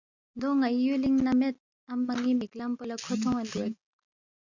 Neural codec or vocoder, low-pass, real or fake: none; 7.2 kHz; real